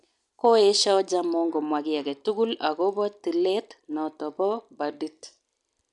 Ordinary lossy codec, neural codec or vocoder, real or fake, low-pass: none; none; real; 10.8 kHz